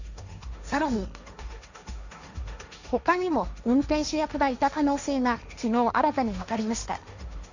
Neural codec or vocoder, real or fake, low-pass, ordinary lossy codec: codec, 16 kHz, 1.1 kbps, Voila-Tokenizer; fake; 7.2 kHz; none